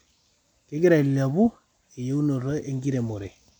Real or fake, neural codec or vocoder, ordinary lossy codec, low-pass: real; none; MP3, 96 kbps; 19.8 kHz